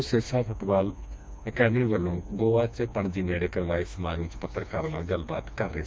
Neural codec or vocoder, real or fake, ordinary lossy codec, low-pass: codec, 16 kHz, 2 kbps, FreqCodec, smaller model; fake; none; none